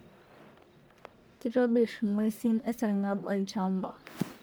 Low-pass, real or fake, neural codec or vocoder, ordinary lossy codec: none; fake; codec, 44.1 kHz, 1.7 kbps, Pupu-Codec; none